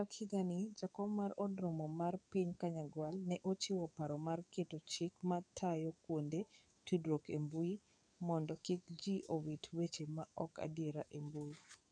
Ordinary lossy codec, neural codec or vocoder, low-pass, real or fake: none; codec, 44.1 kHz, 7.8 kbps, DAC; 9.9 kHz; fake